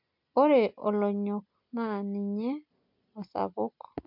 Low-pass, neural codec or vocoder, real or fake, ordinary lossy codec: 5.4 kHz; none; real; MP3, 48 kbps